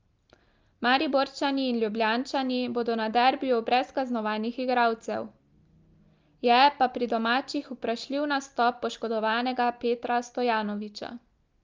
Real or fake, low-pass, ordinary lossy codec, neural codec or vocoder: real; 7.2 kHz; Opus, 24 kbps; none